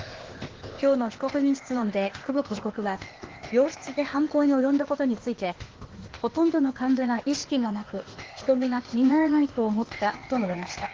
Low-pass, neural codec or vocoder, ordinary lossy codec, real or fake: 7.2 kHz; codec, 16 kHz, 0.8 kbps, ZipCodec; Opus, 16 kbps; fake